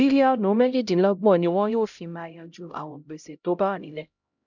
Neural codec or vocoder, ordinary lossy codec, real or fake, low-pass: codec, 16 kHz, 0.5 kbps, X-Codec, HuBERT features, trained on LibriSpeech; none; fake; 7.2 kHz